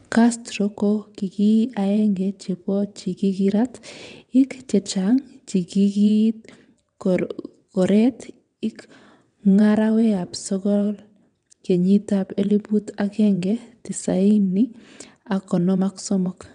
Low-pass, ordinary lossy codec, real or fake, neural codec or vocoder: 9.9 kHz; none; fake; vocoder, 22.05 kHz, 80 mel bands, WaveNeXt